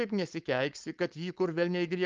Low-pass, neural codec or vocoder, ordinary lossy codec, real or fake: 7.2 kHz; codec, 16 kHz, 4.8 kbps, FACodec; Opus, 24 kbps; fake